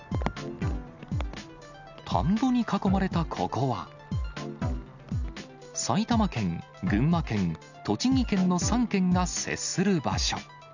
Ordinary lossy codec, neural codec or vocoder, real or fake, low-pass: none; none; real; 7.2 kHz